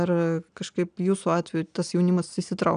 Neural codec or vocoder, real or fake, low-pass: none; real; 9.9 kHz